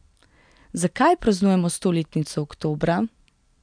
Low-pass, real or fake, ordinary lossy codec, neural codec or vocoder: 9.9 kHz; real; MP3, 96 kbps; none